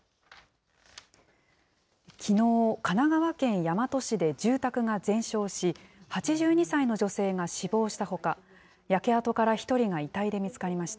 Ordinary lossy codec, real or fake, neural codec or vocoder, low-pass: none; real; none; none